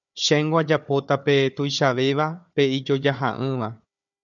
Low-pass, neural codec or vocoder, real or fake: 7.2 kHz; codec, 16 kHz, 4 kbps, FunCodec, trained on Chinese and English, 50 frames a second; fake